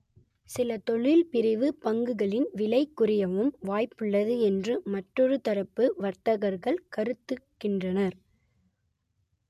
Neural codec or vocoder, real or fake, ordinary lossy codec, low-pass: none; real; MP3, 96 kbps; 14.4 kHz